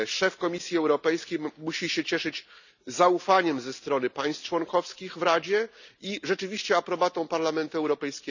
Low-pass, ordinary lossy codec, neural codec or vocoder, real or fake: 7.2 kHz; none; none; real